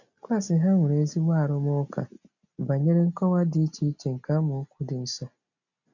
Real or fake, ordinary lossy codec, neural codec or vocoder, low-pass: real; none; none; 7.2 kHz